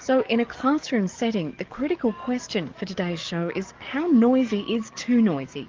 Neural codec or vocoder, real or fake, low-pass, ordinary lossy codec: codec, 44.1 kHz, 7.8 kbps, DAC; fake; 7.2 kHz; Opus, 32 kbps